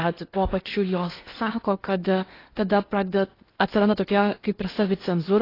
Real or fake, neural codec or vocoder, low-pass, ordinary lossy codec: fake; codec, 16 kHz in and 24 kHz out, 0.8 kbps, FocalCodec, streaming, 65536 codes; 5.4 kHz; AAC, 24 kbps